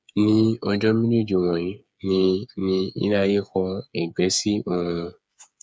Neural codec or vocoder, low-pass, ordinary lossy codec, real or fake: codec, 16 kHz, 8 kbps, FreqCodec, smaller model; none; none; fake